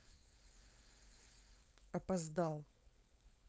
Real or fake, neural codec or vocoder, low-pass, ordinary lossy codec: fake; codec, 16 kHz, 4.8 kbps, FACodec; none; none